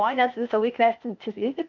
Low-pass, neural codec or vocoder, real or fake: 7.2 kHz; codec, 16 kHz, 0.8 kbps, ZipCodec; fake